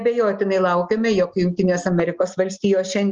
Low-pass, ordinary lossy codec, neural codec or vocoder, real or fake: 7.2 kHz; Opus, 24 kbps; none; real